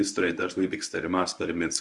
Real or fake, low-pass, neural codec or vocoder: fake; 10.8 kHz; codec, 24 kHz, 0.9 kbps, WavTokenizer, medium speech release version 1